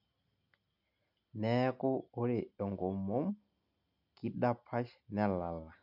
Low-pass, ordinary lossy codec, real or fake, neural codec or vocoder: 5.4 kHz; none; real; none